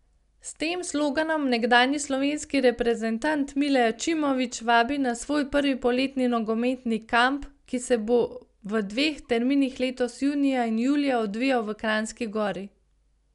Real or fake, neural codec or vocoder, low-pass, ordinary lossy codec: real; none; 10.8 kHz; none